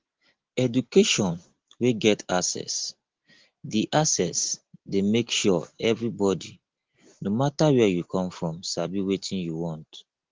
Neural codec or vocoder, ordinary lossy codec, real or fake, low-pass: none; Opus, 16 kbps; real; 7.2 kHz